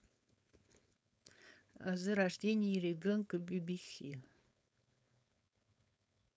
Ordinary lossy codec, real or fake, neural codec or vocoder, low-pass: none; fake; codec, 16 kHz, 4.8 kbps, FACodec; none